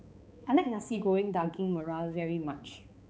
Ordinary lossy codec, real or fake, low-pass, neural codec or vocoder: none; fake; none; codec, 16 kHz, 4 kbps, X-Codec, HuBERT features, trained on balanced general audio